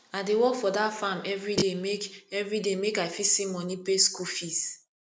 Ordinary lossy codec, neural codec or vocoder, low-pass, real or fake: none; none; none; real